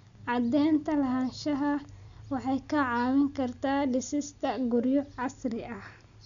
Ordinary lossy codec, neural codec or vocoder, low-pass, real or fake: MP3, 96 kbps; none; 7.2 kHz; real